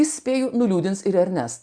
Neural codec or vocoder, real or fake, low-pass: none; real; 9.9 kHz